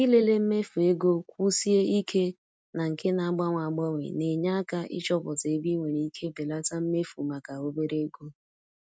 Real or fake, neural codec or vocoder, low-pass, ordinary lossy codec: real; none; none; none